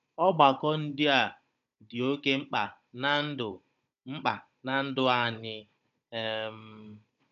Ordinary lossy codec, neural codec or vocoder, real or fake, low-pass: MP3, 64 kbps; codec, 16 kHz, 16 kbps, FunCodec, trained on Chinese and English, 50 frames a second; fake; 7.2 kHz